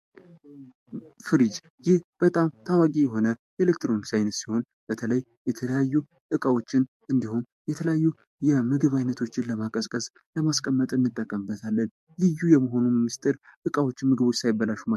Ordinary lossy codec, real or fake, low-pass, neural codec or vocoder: MP3, 64 kbps; fake; 14.4 kHz; codec, 44.1 kHz, 7.8 kbps, DAC